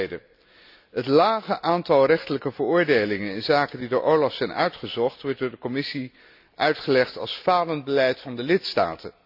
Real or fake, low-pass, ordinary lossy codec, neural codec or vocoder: real; 5.4 kHz; none; none